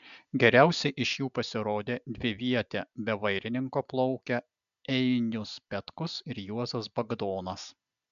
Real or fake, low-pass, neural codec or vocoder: real; 7.2 kHz; none